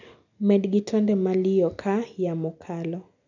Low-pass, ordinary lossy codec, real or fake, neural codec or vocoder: 7.2 kHz; none; real; none